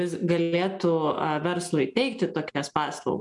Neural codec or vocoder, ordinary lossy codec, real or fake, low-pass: none; MP3, 96 kbps; real; 10.8 kHz